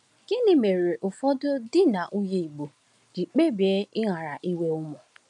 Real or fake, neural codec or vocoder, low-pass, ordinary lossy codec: real; none; 10.8 kHz; none